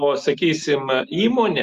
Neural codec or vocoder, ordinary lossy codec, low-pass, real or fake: none; Opus, 64 kbps; 14.4 kHz; real